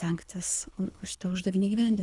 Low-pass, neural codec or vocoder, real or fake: 10.8 kHz; codec, 24 kHz, 3 kbps, HILCodec; fake